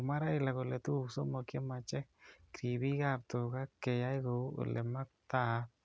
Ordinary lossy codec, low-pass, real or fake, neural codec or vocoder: none; none; real; none